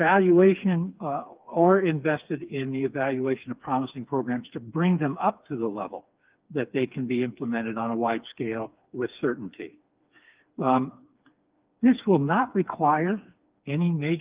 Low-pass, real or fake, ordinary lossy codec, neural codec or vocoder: 3.6 kHz; fake; Opus, 32 kbps; codec, 16 kHz, 4 kbps, FreqCodec, smaller model